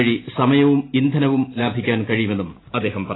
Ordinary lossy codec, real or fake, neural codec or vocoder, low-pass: AAC, 16 kbps; real; none; 7.2 kHz